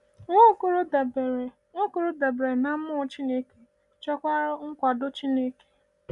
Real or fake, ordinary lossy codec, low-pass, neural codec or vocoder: real; none; 10.8 kHz; none